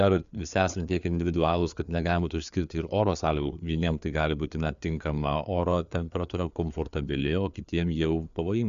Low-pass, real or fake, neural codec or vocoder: 7.2 kHz; fake; codec, 16 kHz, 4 kbps, FreqCodec, larger model